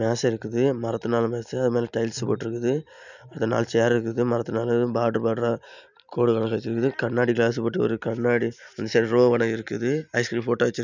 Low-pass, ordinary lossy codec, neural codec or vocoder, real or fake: 7.2 kHz; none; none; real